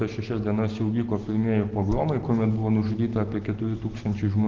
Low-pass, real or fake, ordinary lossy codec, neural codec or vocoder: 7.2 kHz; real; Opus, 16 kbps; none